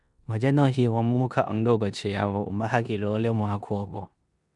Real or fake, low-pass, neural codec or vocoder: fake; 10.8 kHz; codec, 16 kHz in and 24 kHz out, 0.9 kbps, LongCat-Audio-Codec, four codebook decoder